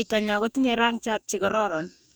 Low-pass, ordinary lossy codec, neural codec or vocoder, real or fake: none; none; codec, 44.1 kHz, 2.6 kbps, DAC; fake